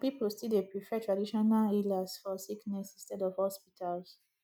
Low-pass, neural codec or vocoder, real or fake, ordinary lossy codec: none; none; real; none